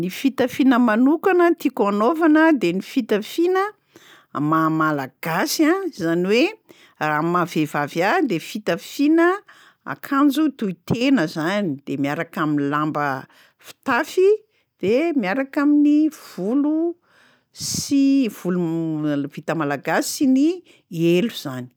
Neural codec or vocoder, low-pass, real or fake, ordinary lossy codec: none; none; real; none